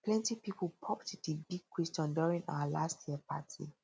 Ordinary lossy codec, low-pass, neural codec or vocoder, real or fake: none; none; none; real